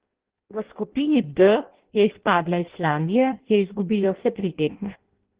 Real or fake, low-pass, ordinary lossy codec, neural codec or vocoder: fake; 3.6 kHz; Opus, 16 kbps; codec, 16 kHz in and 24 kHz out, 0.6 kbps, FireRedTTS-2 codec